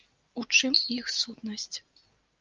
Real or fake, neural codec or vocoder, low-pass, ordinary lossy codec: real; none; 7.2 kHz; Opus, 24 kbps